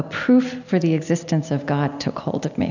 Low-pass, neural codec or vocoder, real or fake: 7.2 kHz; none; real